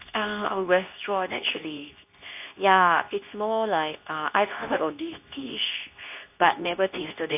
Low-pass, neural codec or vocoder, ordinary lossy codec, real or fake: 3.6 kHz; codec, 24 kHz, 0.9 kbps, WavTokenizer, medium speech release version 2; AAC, 32 kbps; fake